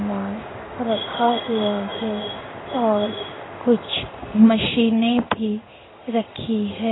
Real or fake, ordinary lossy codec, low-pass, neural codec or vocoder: fake; AAC, 16 kbps; 7.2 kHz; codec, 16 kHz in and 24 kHz out, 1 kbps, XY-Tokenizer